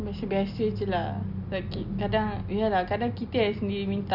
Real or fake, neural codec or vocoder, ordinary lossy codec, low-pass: real; none; none; 5.4 kHz